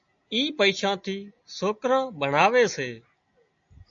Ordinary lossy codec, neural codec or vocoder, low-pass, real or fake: AAC, 64 kbps; none; 7.2 kHz; real